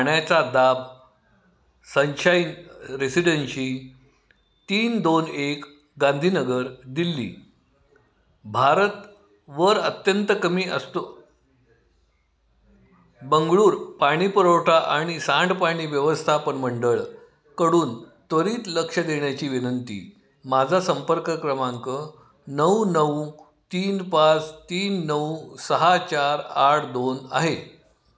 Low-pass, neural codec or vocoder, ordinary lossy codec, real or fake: none; none; none; real